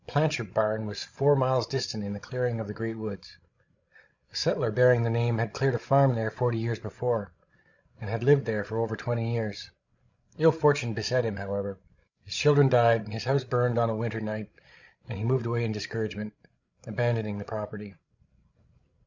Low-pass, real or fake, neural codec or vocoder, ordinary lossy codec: 7.2 kHz; fake; codec, 16 kHz, 16 kbps, FreqCodec, larger model; Opus, 64 kbps